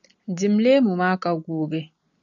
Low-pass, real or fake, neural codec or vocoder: 7.2 kHz; real; none